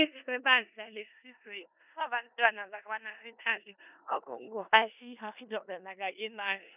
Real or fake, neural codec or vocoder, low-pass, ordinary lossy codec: fake; codec, 16 kHz in and 24 kHz out, 0.4 kbps, LongCat-Audio-Codec, four codebook decoder; 3.6 kHz; none